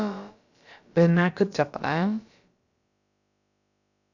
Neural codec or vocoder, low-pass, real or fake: codec, 16 kHz, about 1 kbps, DyCAST, with the encoder's durations; 7.2 kHz; fake